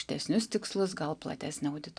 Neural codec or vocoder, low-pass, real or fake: none; 9.9 kHz; real